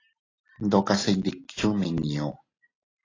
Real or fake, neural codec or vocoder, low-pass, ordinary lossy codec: real; none; 7.2 kHz; AAC, 32 kbps